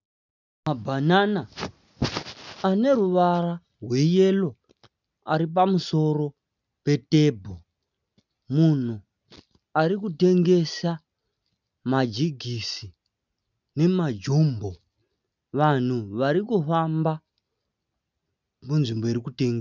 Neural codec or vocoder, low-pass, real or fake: none; 7.2 kHz; real